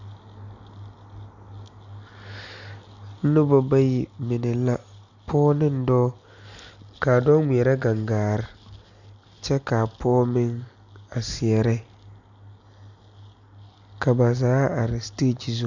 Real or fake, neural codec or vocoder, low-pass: real; none; 7.2 kHz